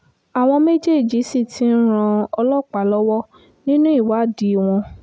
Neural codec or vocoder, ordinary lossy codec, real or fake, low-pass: none; none; real; none